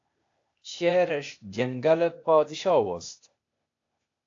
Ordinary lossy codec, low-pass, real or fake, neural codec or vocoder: AAC, 48 kbps; 7.2 kHz; fake; codec, 16 kHz, 0.8 kbps, ZipCodec